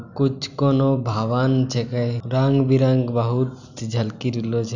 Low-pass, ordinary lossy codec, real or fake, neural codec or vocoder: 7.2 kHz; none; real; none